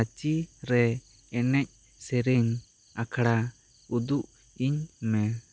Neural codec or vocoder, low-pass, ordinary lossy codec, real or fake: none; none; none; real